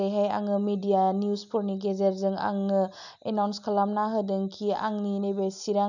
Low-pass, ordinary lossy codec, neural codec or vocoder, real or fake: 7.2 kHz; none; none; real